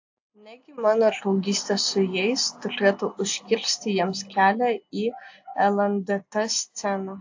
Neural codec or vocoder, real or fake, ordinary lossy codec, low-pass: none; real; AAC, 48 kbps; 7.2 kHz